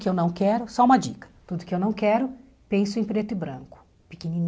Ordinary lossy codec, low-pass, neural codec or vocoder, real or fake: none; none; none; real